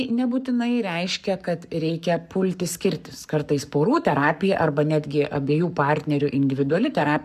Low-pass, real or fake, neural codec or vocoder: 14.4 kHz; fake; codec, 44.1 kHz, 7.8 kbps, Pupu-Codec